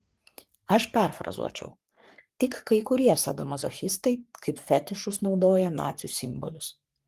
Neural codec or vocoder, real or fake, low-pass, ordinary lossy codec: codec, 44.1 kHz, 3.4 kbps, Pupu-Codec; fake; 14.4 kHz; Opus, 24 kbps